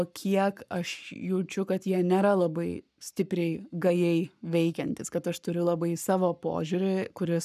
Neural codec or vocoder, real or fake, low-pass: codec, 44.1 kHz, 7.8 kbps, Pupu-Codec; fake; 14.4 kHz